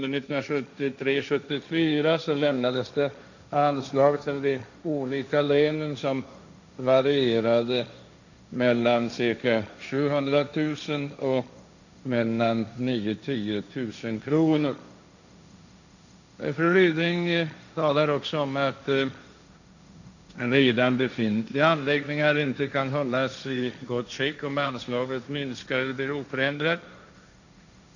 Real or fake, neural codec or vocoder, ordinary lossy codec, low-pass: fake; codec, 16 kHz, 1.1 kbps, Voila-Tokenizer; none; 7.2 kHz